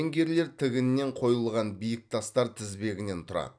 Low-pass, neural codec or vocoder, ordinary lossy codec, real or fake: 9.9 kHz; vocoder, 44.1 kHz, 128 mel bands every 256 samples, BigVGAN v2; none; fake